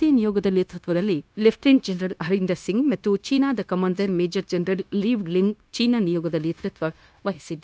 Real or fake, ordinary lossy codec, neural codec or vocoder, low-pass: fake; none; codec, 16 kHz, 0.9 kbps, LongCat-Audio-Codec; none